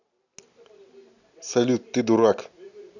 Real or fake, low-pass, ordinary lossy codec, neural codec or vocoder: real; 7.2 kHz; none; none